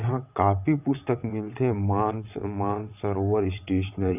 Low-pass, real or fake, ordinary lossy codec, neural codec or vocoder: 3.6 kHz; fake; none; vocoder, 22.05 kHz, 80 mel bands, WaveNeXt